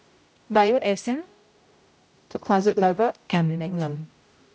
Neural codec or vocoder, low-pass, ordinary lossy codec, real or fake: codec, 16 kHz, 0.5 kbps, X-Codec, HuBERT features, trained on general audio; none; none; fake